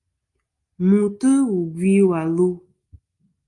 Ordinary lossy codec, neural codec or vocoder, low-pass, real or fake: Opus, 32 kbps; none; 10.8 kHz; real